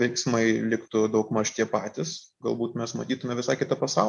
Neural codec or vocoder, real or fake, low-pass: none; real; 10.8 kHz